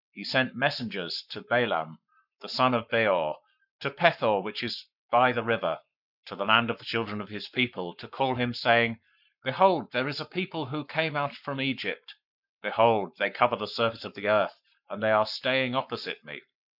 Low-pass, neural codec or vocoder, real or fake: 5.4 kHz; codec, 44.1 kHz, 7.8 kbps, Pupu-Codec; fake